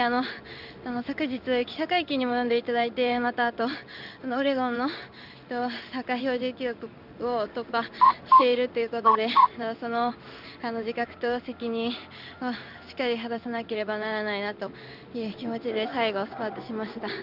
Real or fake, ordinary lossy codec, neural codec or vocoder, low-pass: fake; none; codec, 16 kHz in and 24 kHz out, 1 kbps, XY-Tokenizer; 5.4 kHz